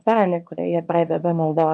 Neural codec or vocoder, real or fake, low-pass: codec, 24 kHz, 0.9 kbps, WavTokenizer, small release; fake; 10.8 kHz